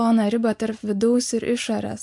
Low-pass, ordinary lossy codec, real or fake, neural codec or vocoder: 10.8 kHz; MP3, 64 kbps; real; none